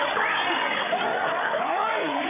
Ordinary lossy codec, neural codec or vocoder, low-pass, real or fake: none; codec, 16 kHz, 8 kbps, FreqCodec, larger model; 3.6 kHz; fake